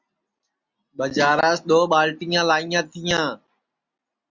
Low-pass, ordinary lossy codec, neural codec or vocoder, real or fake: 7.2 kHz; Opus, 64 kbps; none; real